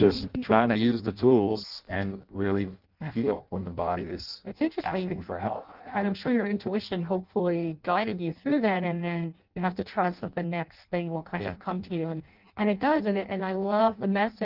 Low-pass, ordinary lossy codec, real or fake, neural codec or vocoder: 5.4 kHz; Opus, 24 kbps; fake; codec, 16 kHz in and 24 kHz out, 0.6 kbps, FireRedTTS-2 codec